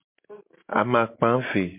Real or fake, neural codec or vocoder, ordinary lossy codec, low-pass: real; none; MP3, 32 kbps; 3.6 kHz